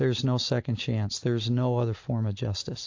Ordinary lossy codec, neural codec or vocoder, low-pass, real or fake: AAC, 48 kbps; none; 7.2 kHz; real